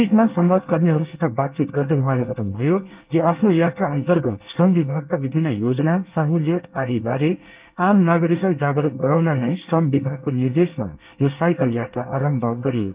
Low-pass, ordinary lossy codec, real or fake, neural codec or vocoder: 3.6 kHz; Opus, 32 kbps; fake; codec, 24 kHz, 1 kbps, SNAC